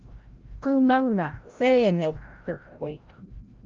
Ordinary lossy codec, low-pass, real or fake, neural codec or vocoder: Opus, 32 kbps; 7.2 kHz; fake; codec, 16 kHz, 0.5 kbps, FreqCodec, larger model